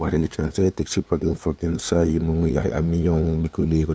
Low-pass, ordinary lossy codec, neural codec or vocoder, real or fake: none; none; codec, 16 kHz, 2 kbps, FunCodec, trained on LibriTTS, 25 frames a second; fake